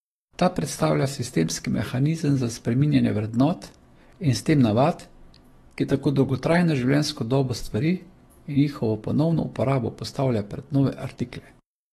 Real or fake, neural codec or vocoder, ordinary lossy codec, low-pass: fake; autoencoder, 48 kHz, 128 numbers a frame, DAC-VAE, trained on Japanese speech; AAC, 32 kbps; 19.8 kHz